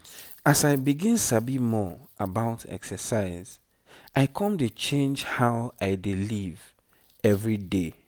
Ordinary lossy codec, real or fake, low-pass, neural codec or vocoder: none; real; none; none